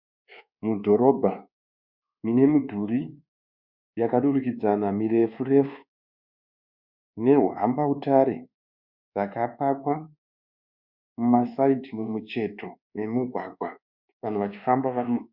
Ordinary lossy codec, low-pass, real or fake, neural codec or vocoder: Opus, 64 kbps; 5.4 kHz; fake; codec, 24 kHz, 1.2 kbps, DualCodec